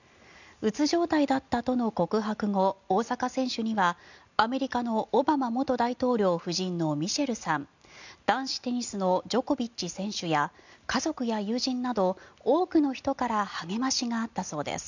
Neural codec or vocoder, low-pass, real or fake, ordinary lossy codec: none; 7.2 kHz; real; none